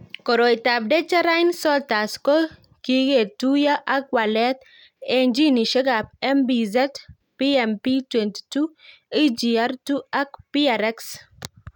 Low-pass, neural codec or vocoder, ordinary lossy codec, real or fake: 19.8 kHz; none; none; real